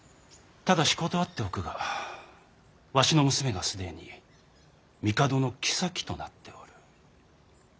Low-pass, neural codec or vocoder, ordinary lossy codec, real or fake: none; none; none; real